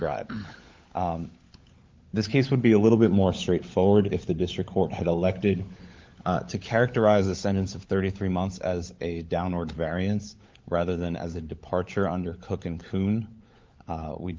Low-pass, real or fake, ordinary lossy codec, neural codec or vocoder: 7.2 kHz; fake; Opus, 32 kbps; codec, 16 kHz, 16 kbps, FunCodec, trained on LibriTTS, 50 frames a second